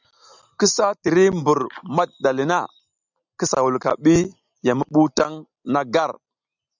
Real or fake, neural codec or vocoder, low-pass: real; none; 7.2 kHz